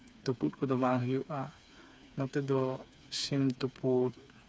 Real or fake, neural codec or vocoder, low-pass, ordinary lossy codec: fake; codec, 16 kHz, 4 kbps, FreqCodec, smaller model; none; none